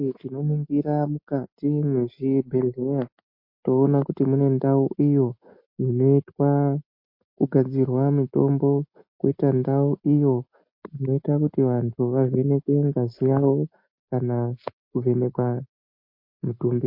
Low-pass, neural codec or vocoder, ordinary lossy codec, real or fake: 5.4 kHz; none; MP3, 32 kbps; real